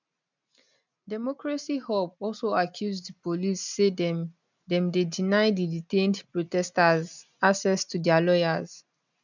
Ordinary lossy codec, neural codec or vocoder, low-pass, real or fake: none; none; 7.2 kHz; real